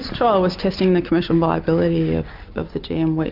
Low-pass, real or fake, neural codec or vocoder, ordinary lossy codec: 5.4 kHz; real; none; Opus, 64 kbps